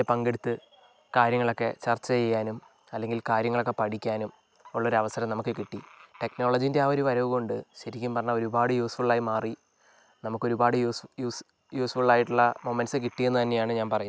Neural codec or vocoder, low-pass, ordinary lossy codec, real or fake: none; none; none; real